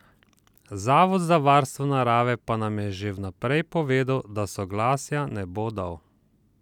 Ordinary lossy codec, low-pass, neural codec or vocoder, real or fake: none; 19.8 kHz; none; real